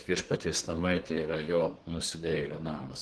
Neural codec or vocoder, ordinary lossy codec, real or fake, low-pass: codec, 24 kHz, 1 kbps, SNAC; Opus, 16 kbps; fake; 10.8 kHz